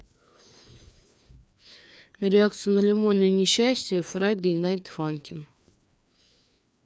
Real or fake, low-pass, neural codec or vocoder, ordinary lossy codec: fake; none; codec, 16 kHz, 2 kbps, FreqCodec, larger model; none